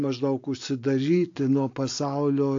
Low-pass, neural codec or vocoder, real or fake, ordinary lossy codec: 7.2 kHz; none; real; AAC, 48 kbps